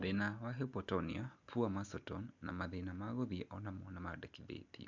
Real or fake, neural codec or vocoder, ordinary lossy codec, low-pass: real; none; MP3, 64 kbps; 7.2 kHz